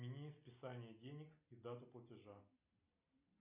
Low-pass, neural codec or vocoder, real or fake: 3.6 kHz; none; real